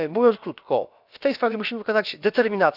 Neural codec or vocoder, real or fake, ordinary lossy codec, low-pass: codec, 16 kHz, about 1 kbps, DyCAST, with the encoder's durations; fake; none; 5.4 kHz